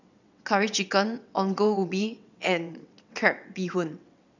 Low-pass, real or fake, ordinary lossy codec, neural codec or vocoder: 7.2 kHz; fake; none; vocoder, 22.05 kHz, 80 mel bands, WaveNeXt